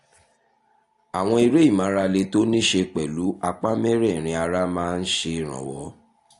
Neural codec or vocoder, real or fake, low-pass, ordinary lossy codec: none; real; 10.8 kHz; AAC, 48 kbps